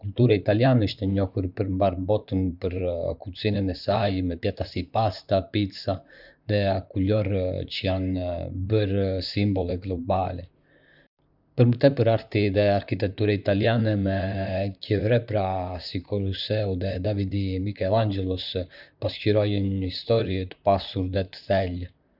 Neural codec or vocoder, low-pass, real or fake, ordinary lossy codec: vocoder, 44.1 kHz, 128 mel bands, Pupu-Vocoder; 5.4 kHz; fake; none